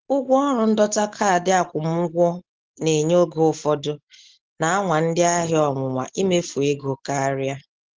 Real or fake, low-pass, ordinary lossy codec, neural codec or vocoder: real; 7.2 kHz; Opus, 16 kbps; none